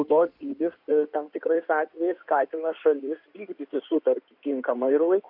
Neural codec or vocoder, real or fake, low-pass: codec, 16 kHz in and 24 kHz out, 2.2 kbps, FireRedTTS-2 codec; fake; 5.4 kHz